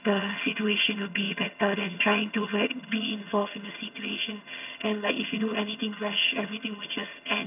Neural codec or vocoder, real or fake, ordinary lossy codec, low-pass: vocoder, 22.05 kHz, 80 mel bands, HiFi-GAN; fake; none; 3.6 kHz